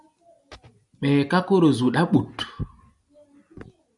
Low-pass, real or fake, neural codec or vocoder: 10.8 kHz; real; none